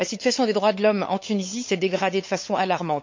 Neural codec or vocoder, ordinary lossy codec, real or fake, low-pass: codec, 16 kHz, 8 kbps, FunCodec, trained on LibriTTS, 25 frames a second; MP3, 48 kbps; fake; 7.2 kHz